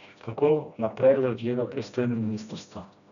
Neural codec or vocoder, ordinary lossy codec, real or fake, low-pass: codec, 16 kHz, 1 kbps, FreqCodec, smaller model; MP3, 96 kbps; fake; 7.2 kHz